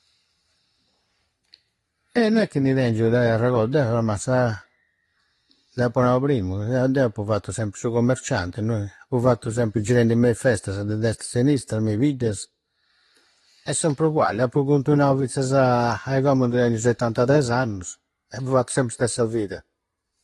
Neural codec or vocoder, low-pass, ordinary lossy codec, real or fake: none; 19.8 kHz; AAC, 32 kbps; real